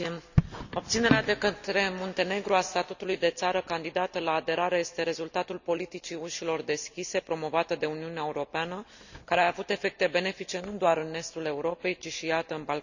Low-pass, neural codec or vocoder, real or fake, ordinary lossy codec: 7.2 kHz; none; real; none